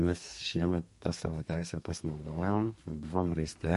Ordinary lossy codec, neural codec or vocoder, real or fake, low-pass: MP3, 48 kbps; codec, 32 kHz, 1.9 kbps, SNAC; fake; 14.4 kHz